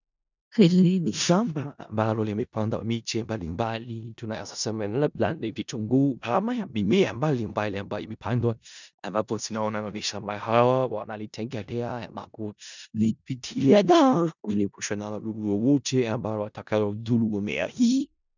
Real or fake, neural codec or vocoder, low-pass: fake; codec, 16 kHz in and 24 kHz out, 0.4 kbps, LongCat-Audio-Codec, four codebook decoder; 7.2 kHz